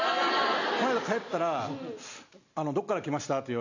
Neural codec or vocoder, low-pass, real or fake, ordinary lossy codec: none; 7.2 kHz; real; none